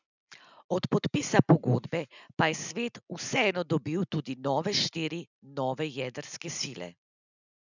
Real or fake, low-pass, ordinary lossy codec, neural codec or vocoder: real; 7.2 kHz; none; none